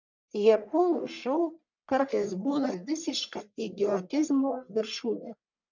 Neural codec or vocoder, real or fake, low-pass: codec, 44.1 kHz, 1.7 kbps, Pupu-Codec; fake; 7.2 kHz